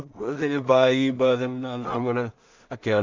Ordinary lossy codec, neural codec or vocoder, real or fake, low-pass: MP3, 64 kbps; codec, 16 kHz in and 24 kHz out, 0.4 kbps, LongCat-Audio-Codec, two codebook decoder; fake; 7.2 kHz